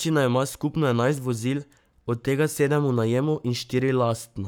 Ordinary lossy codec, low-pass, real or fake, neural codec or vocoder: none; none; fake; codec, 44.1 kHz, 7.8 kbps, Pupu-Codec